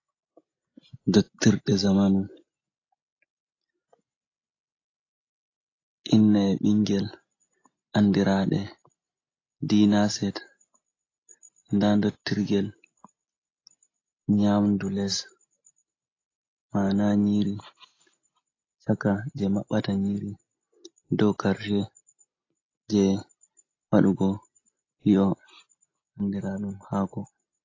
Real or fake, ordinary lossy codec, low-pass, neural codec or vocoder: real; AAC, 32 kbps; 7.2 kHz; none